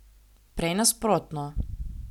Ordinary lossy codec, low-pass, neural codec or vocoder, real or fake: none; 19.8 kHz; none; real